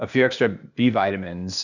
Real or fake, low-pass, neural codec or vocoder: fake; 7.2 kHz; codec, 16 kHz, 0.8 kbps, ZipCodec